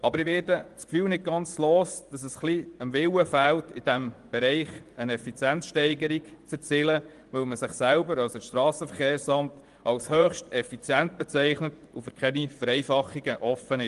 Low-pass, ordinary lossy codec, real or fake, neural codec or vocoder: 10.8 kHz; Opus, 24 kbps; fake; vocoder, 24 kHz, 100 mel bands, Vocos